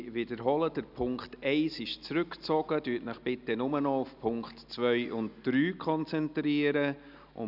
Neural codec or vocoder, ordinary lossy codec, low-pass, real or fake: none; none; 5.4 kHz; real